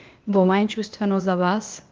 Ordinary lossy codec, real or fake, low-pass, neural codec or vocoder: Opus, 24 kbps; fake; 7.2 kHz; codec, 16 kHz, 0.8 kbps, ZipCodec